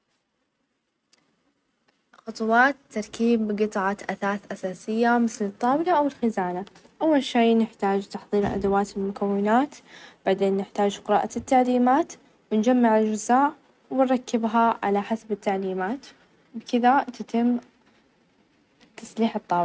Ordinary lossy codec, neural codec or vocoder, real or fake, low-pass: none; none; real; none